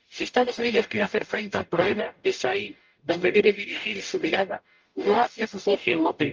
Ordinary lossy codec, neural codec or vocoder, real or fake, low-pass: Opus, 24 kbps; codec, 44.1 kHz, 0.9 kbps, DAC; fake; 7.2 kHz